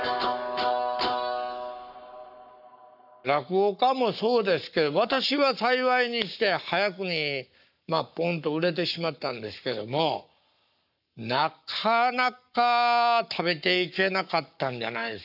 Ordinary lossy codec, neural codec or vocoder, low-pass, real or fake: none; codec, 44.1 kHz, 7.8 kbps, Pupu-Codec; 5.4 kHz; fake